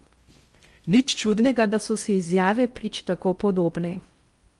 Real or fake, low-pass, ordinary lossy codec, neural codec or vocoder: fake; 10.8 kHz; Opus, 32 kbps; codec, 16 kHz in and 24 kHz out, 0.6 kbps, FocalCodec, streaming, 2048 codes